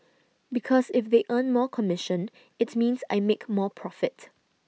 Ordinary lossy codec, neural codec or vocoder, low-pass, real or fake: none; none; none; real